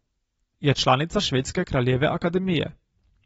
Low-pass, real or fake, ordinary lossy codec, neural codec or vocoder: 19.8 kHz; real; AAC, 24 kbps; none